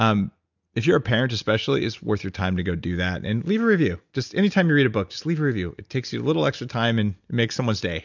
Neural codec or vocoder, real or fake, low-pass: none; real; 7.2 kHz